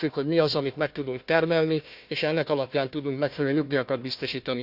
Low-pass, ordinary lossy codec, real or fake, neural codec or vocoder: 5.4 kHz; none; fake; codec, 16 kHz, 1 kbps, FunCodec, trained on Chinese and English, 50 frames a second